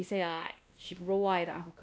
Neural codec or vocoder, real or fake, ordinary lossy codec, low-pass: codec, 16 kHz, 1 kbps, X-Codec, WavLM features, trained on Multilingual LibriSpeech; fake; none; none